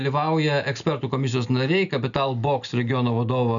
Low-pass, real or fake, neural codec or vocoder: 7.2 kHz; real; none